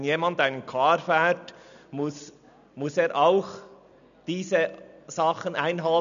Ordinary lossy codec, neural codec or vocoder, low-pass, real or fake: none; none; 7.2 kHz; real